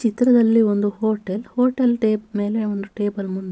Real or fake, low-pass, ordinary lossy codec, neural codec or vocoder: real; none; none; none